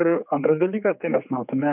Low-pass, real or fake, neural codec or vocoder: 3.6 kHz; fake; codec, 16 kHz, 4 kbps, X-Codec, HuBERT features, trained on general audio